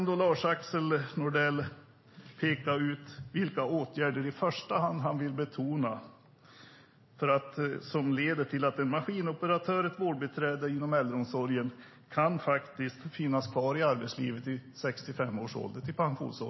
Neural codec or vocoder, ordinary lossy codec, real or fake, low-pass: none; MP3, 24 kbps; real; 7.2 kHz